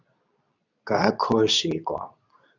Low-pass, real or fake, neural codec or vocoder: 7.2 kHz; fake; codec, 24 kHz, 0.9 kbps, WavTokenizer, medium speech release version 2